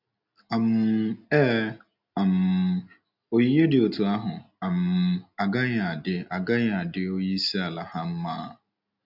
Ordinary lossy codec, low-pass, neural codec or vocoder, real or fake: none; 5.4 kHz; none; real